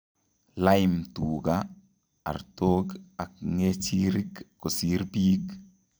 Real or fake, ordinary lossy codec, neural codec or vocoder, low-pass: fake; none; vocoder, 44.1 kHz, 128 mel bands every 256 samples, BigVGAN v2; none